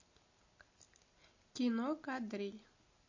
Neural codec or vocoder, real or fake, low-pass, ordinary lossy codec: none; real; 7.2 kHz; MP3, 32 kbps